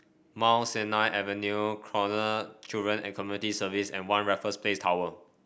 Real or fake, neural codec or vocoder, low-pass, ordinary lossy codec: real; none; none; none